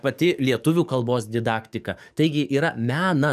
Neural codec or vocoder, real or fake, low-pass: codec, 44.1 kHz, 7.8 kbps, DAC; fake; 14.4 kHz